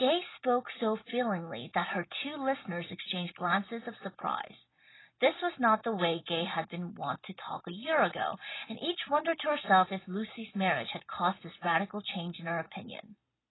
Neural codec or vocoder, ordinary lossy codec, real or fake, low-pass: none; AAC, 16 kbps; real; 7.2 kHz